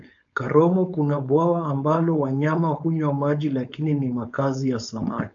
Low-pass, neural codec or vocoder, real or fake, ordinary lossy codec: 7.2 kHz; codec, 16 kHz, 4.8 kbps, FACodec; fake; AAC, 64 kbps